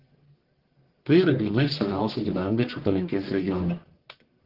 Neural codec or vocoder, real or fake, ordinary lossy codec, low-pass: codec, 44.1 kHz, 1.7 kbps, Pupu-Codec; fake; Opus, 16 kbps; 5.4 kHz